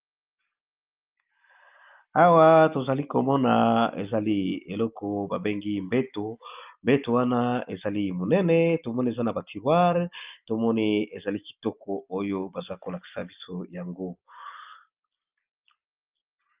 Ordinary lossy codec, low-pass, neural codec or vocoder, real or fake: Opus, 24 kbps; 3.6 kHz; none; real